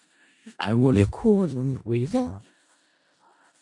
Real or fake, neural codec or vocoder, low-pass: fake; codec, 16 kHz in and 24 kHz out, 0.4 kbps, LongCat-Audio-Codec, four codebook decoder; 10.8 kHz